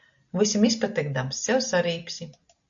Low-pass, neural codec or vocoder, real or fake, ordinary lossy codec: 7.2 kHz; none; real; AAC, 64 kbps